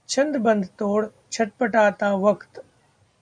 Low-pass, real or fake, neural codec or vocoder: 9.9 kHz; real; none